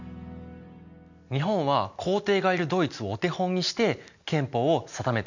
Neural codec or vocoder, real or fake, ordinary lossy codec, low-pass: none; real; none; 7.2 kHz